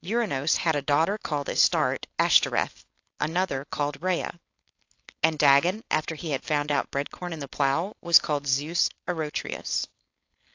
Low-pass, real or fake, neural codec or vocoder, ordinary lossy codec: 7.2 kHz; fake; codec, 16 kHz, 4.8 kbps, FACodec; AAC, 48 kbps